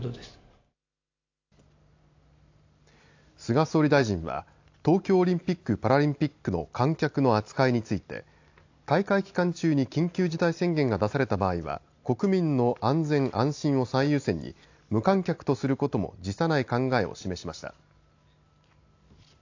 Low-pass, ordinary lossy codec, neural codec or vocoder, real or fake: 7.2 kHz; AAC, 48 kbps; none; real